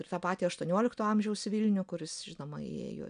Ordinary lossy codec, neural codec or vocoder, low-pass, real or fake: MP3, 96 kbps; none; 9.9 kHz; real